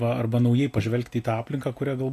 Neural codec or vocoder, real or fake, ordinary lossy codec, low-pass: none; real; AAC, 64 kbps; 14.4 kHz